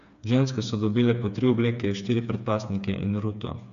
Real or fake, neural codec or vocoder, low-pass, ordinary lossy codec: fake; codec, 16 kHz, 4 kbps, FreqCodec, smaller model; 7.2 kHz; none